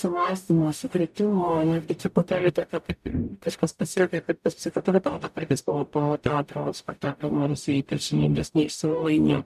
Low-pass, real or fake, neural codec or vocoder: 14.4 kHz; fake; codec, 44.1 kHz, 0.9 kbps, DAC